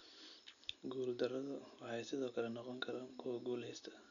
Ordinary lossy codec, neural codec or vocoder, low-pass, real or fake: none; none; 7.2 kHz; real